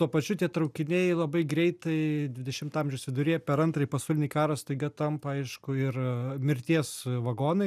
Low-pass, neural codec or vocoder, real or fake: 14.4 kHz; none; real